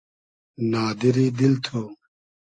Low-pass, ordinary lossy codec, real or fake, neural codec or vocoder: 9.9 kHz; AAC, 48 kbps; real; none